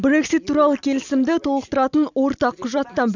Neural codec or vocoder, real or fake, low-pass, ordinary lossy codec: none; real; 7.2 kHz; none